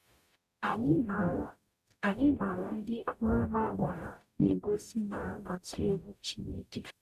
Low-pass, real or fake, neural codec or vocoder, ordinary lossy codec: 14.4 kHz; fake; codec, 44.1 kHz, 0.9 kbps, DAC; none